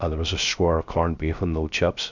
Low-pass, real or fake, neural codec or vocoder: 7.2 kHz; fake; codec, 16 kHz, 0.3 kbps, FocalCodec